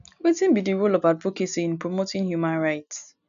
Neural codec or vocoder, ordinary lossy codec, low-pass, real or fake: none; none; 7.2 kHz; real